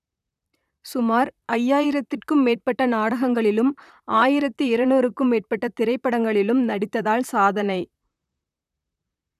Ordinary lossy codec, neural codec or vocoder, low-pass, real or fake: none; vocoder, 44.1 kHz, 128 mel bands, Pupu-Vocoder; 14.4 kHz; fake